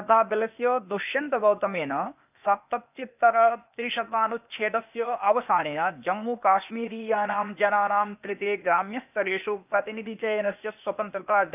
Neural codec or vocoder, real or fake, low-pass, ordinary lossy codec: codec, 16 kHz, 0.8 kbps, ZipCodec; fake; 3.6 kHz; none